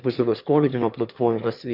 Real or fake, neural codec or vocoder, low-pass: fake; autoencoder, 22.05 kHz, a latent of 192 numbers a frame, VITS, trained on one speaker; 5.4 kHz